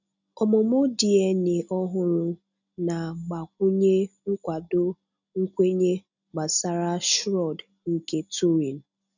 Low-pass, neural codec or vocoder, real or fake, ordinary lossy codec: 7.2 kHz; none; real; none